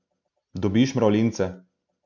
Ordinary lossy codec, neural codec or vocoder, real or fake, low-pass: none; none; real; 7.2 kHz